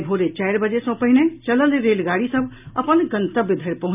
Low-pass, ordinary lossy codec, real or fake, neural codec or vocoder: 3.6 kHz; none; real; none